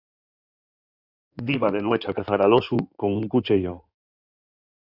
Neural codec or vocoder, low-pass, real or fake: codec, 16 kHz in and 24 kHz out, 2.2 kbps, FireRedTTS-2 codec; 5.4 kHz; fake